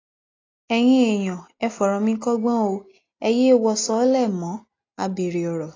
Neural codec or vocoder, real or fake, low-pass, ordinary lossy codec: none; real; 7.2 kHz; AAC, 32 kbps